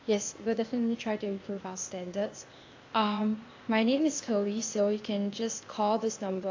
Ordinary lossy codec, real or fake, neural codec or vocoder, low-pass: AAC, 48 kbps; fake; codec, 16 kHz, 0.8 kbps, ZipCodec; 7.2 kHz